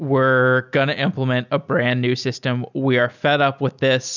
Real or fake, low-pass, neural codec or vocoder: real; 7.2 kHz; none